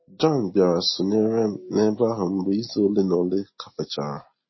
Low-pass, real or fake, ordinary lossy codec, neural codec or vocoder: 7.2 kHz; real; MP3, 24 kbps; none